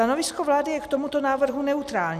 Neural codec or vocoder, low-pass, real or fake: none; 14.4 kHz; real